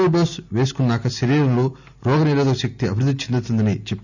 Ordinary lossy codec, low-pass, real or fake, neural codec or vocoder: none; 7.2 kHz; real; none